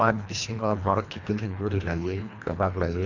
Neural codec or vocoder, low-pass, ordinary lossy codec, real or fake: codec, 24 kHz, 1.5 kbps, HILCodec; 7.2 kHz; none; fake